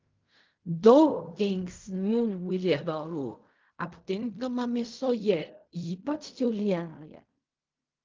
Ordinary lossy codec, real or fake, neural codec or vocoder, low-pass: Opus, 32 kbps; fake; codec, 16 kHz in and 24 kHz out, 0.4 kbps, LongCat-Audio-Codec, fine tuned four codebook decoder; 7.2 kHz